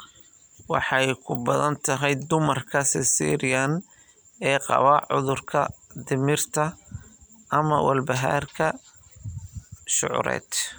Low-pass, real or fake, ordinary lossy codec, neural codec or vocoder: none; real; none; none